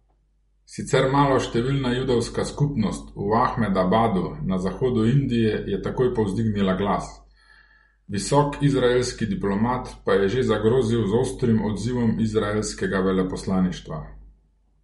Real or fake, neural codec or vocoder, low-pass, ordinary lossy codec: real; none; 19.8 kHz; MP3, 48 kbps